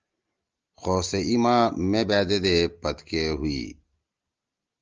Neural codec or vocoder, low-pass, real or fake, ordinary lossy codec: none; 7.2 kHz; real; Opus, 24 kbps